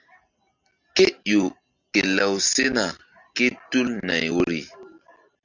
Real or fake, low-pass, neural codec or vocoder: real; 7.2 kHz; none